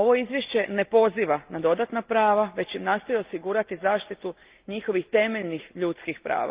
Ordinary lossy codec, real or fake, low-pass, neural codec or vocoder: Opus, 32 kbps; real; 3.6 kHz; none